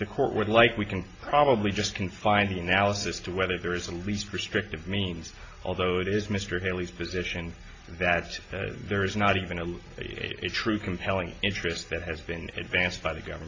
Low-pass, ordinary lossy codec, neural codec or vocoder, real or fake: 7.2 kHz; AAC, 32 kbps; none; real